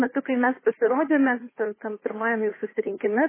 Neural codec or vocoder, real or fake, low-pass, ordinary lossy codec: codec, 16 kHz, 2 kbps, FunCodec, trained on Chinese and English, 25 frames a second; fake; 3.6 kHz; MP3, 16 kbps